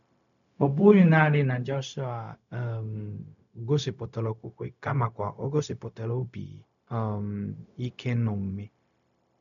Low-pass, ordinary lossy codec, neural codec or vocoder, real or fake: 7.2 kHz; none; codec, 16 kHz, 0.4 kbps, LongCat-Audio-Codec; fake